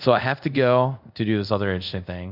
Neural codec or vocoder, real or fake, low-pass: codec, 24 kHz, 0.5 kbps, DualCodec; fake; 5.4 kHz